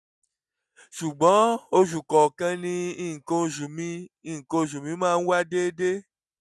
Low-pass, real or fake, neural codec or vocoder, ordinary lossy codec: none; real; none; none